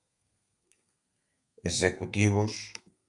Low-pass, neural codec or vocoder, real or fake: 10.8 kHz; codec, 32 kHz, 1.9 kbps, SNAC; fake